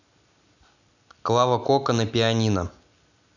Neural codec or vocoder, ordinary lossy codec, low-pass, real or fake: none; none; 7.2 kHz; real